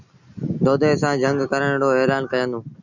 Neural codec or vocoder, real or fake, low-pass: none; real; 7.2 kHz